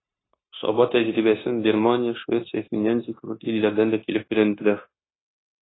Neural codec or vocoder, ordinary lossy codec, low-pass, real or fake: codec, 16 kHz, 0.9 kbps, LongCat-Audio-Codec; AAC, 16 kbps; 7.2 kHz; fake